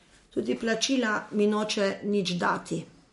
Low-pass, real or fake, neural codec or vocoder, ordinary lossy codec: 14.4 kHz; real; none; MP3, 48 kbps